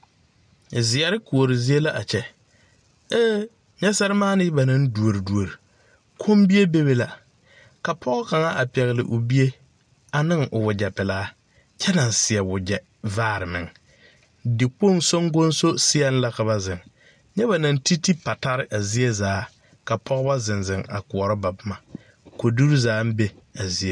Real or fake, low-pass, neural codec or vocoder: real; 9.9 kHz; none